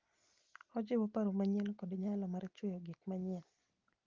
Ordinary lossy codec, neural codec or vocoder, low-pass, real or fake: Opus, 32 kbps; none; 7.2 kHz; real